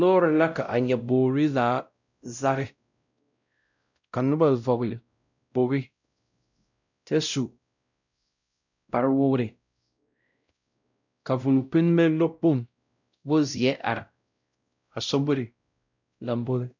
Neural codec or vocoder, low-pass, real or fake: codec, 16 kHz, 0.5 kbps, X-Codec, WavLM features, trained on Multilingual LibriSpeech; 7.2 kHz; fake